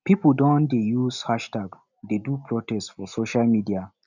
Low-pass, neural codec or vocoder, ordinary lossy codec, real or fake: 7.2 kHz; none; none; real